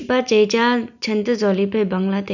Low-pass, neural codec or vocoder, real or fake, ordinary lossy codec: 7.2 kHz; none; real; none